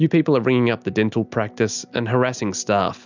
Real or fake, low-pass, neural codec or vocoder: real; 7.2 kHz; none